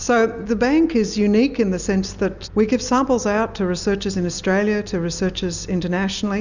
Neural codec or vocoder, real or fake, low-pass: none; real; 7.2 kHz